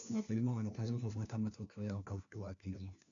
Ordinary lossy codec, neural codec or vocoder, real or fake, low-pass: none; codec, 16 kHz, 1 kbps, FunCodec, trained on LibriTTS, 50 frames a second; fake; 7.2 kHz